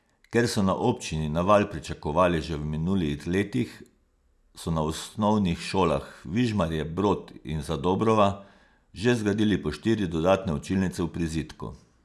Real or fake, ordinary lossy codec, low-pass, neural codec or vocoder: real; none; none; none